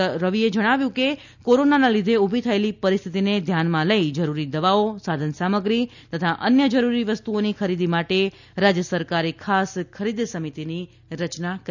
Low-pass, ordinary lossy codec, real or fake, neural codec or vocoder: 7.2 kHz; none; real; none